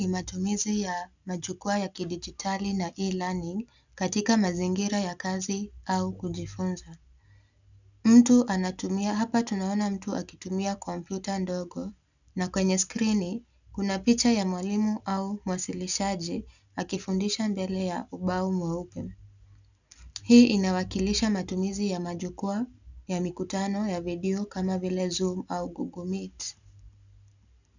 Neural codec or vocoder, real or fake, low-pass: none; real; 7.2 kHz